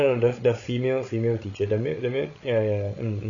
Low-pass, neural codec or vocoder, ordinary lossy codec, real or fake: 7.2 kHz; codec, 16 kHz, 16 kbps, FreqCodec, larger model; none; fake